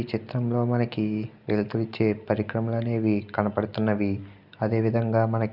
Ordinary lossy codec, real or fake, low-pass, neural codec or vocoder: Opus, 64 kbps; real; 5.4 kHz; none